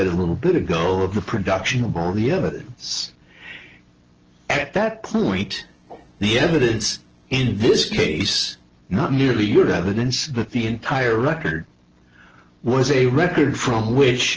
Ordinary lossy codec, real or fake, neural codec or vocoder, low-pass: Opus, 16 kbps; real; none; 7.2 kHz